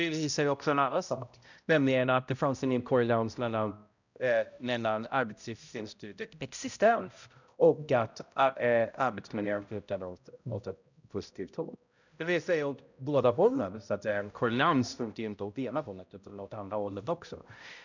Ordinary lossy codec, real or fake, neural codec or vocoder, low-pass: none; fake; codec, 16 kHz, 0.5 kbps, X-Codec, HuBERT features, trained on balanced general audio; 7.2 kHz